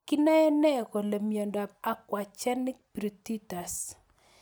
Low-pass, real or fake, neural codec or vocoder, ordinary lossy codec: none; real; none; none